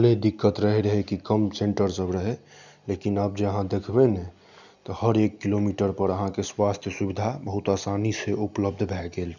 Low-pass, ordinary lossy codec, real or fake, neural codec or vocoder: 7.2 kHz; none; real; none